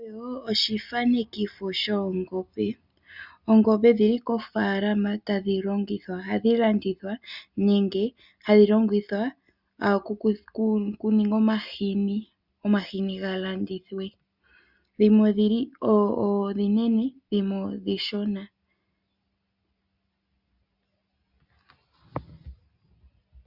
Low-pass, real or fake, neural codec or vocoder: 5.4 kHz; real; none